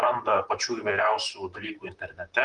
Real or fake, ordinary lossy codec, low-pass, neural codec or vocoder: fake; Opus, 32 kbps; 10.8 kHz; vocoder, 44.1 kHz, 128 mel bands, Pupu-Vocoder